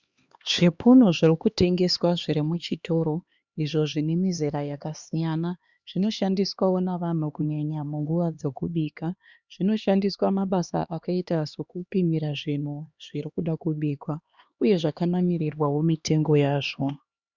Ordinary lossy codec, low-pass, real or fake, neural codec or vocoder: Opus, 64 kbps; 7.2 kHz; fake; codec, 16 kHz, 2 kbps, X-Codec, HuBERT features, trained on LibriSpeech